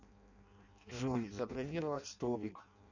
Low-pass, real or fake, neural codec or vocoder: 7.2 kHz; fake; codec, 16 kHz in and 24 kHz out, 0.6 kbps, FireRedTTS-2 codec